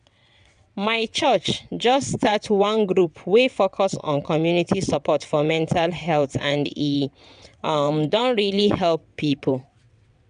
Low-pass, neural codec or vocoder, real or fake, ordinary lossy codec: 9.9 kHz; vocoder, 22.05 kHz, 80 mel bands, WaveNeXt; fake; none